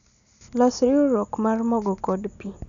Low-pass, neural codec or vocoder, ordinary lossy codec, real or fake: 7.2 kHz; none; none; real